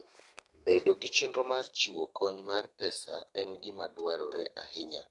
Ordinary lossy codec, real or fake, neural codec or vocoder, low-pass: AAC, 48 kbps; fake; codec, 32 kHz, 1.9 kbps, SNAC; 10.8 kHz